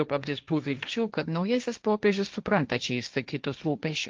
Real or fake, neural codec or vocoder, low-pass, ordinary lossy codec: fake; codec, 16 kHz, 1.1 kbps, Voila-Tokenizer; 7.2 kHz; Opus, 24 kbps